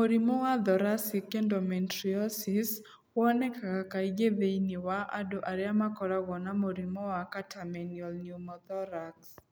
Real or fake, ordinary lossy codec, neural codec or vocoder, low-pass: real; none; none; none